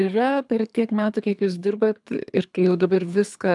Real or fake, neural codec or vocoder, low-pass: fake; codec, 24 kHz, 3 kbps, HILCodec; 10.8 kHz